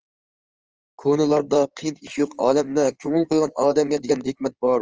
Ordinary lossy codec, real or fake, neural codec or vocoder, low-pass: Opus, 24 kbps; fake; codec, 16 kHz in and 24 kHz out, 2.2 kbps, FireRedTTS-2 codec; 7.2 kHz